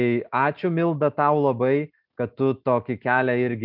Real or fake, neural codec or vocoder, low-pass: real; none; 5.4 kHz